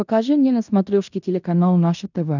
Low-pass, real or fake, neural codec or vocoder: 7.2 kHz; fake; codec, 16 kHz in and 24 kHz out, 0.9 kbps, LongCat-Audio-Codec, fine tuned four codebook decoder